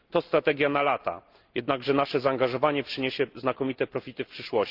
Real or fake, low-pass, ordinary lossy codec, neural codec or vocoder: real; 5.4 kHz; Opus, 32 kbps; none